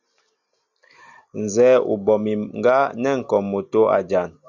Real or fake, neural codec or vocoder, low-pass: real; none; 7.2 kHz